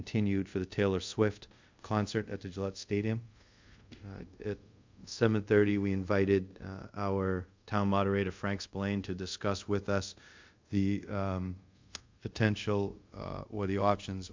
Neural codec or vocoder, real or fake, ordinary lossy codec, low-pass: codec, 24 kHz, 0.5 kbps, DualCodec; fake; MP3, 64 kbps; 7.2 kHz